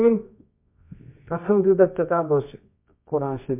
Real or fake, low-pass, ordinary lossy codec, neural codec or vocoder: fake; 3.6 kHz; MP3, 32 kbps; codec, 24 kHz, 0.9 kbps, WavTokenizer, medium music audio release